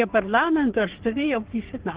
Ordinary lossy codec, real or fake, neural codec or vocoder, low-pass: Opus, 16 kbps; fake; codec, 44.1 kHz, 3.4 kbps, Pupu-Codec; 3.6 kHz